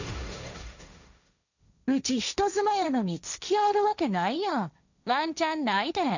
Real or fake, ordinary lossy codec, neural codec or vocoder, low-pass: fake; none; codec, 16 kHz, 1.1 kbps, Voila-Tokenizer; 7.2 kHz